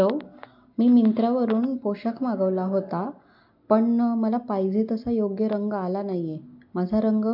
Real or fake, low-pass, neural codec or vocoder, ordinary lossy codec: real; 5.4 kHz; none; MP3, 48 kbps